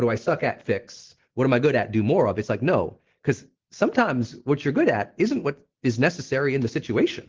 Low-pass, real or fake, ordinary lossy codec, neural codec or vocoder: 7.2 kHz; real; Opus, 16 kbps; none